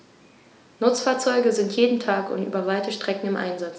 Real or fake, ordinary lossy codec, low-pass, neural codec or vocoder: real; none; none; none